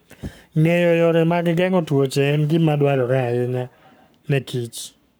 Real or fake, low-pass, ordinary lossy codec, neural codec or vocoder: fake; none; none; codec, 44.1 kHz, 7.8 kbps, Pupu-Codec